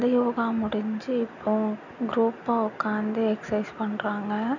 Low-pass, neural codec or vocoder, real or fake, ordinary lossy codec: 7.2 kHz; none; real; none